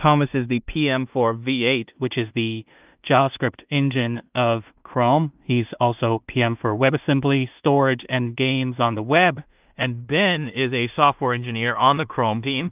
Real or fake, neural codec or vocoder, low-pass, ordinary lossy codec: fake; codec, 16 kHz in and 24 kHz out, 0.4 kbps, LongCat-Audio-Codec, two codebook decoder; 3.6 kHz; Opus, 24 kbps